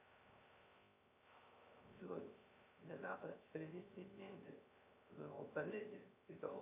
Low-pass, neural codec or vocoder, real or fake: 3.6 kHz; codec, 16 kHz, 0.3 kbps, FocalCodec; fake